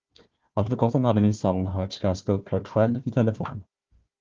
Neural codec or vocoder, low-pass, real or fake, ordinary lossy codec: codec, 16 kHz, 1 kbps, FunCodec, trained on Chinese and English, 50 frames a second; 7.2 kHz; fake; Opus, 32 kbps